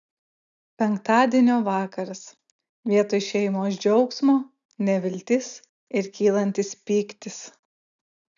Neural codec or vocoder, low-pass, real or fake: none; 7.2 kHz; real